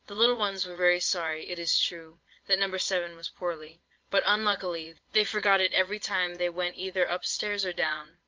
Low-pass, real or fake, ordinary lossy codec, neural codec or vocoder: 7.2 kHz; fake; Opus, 32 kbps; vocoder, 22.05 kHz, 80 mel bands, Vocos